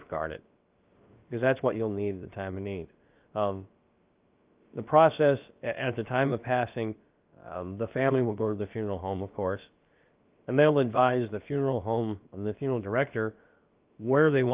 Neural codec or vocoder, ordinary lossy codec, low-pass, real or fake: codec, 16 kHz, about 1 kbps, DyCAST, with the encoder's durations; Opus, 24 kbps; 3.6 kHz; fake